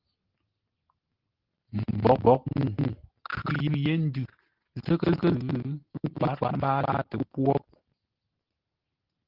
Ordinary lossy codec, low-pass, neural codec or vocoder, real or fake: Opus, 16 kbps; 5.4 kHz; none; real